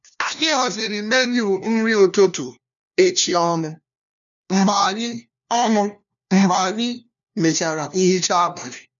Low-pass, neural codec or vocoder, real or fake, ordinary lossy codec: 7.2 kHz; codec, 16 kHz, 1 kbps, FunCodec, trained on LibriTTS, 50 frames a second; fake; none